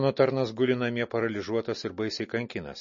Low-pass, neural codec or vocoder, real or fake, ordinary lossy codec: 7.2 kHz; none; real; MP3, 32 kbps